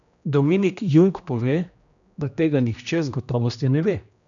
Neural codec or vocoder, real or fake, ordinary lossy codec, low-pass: codec, 16 kHz, 1 kbps, X-Codec, HuBERT features, trained on general audio; fake; none; 7.2 kHz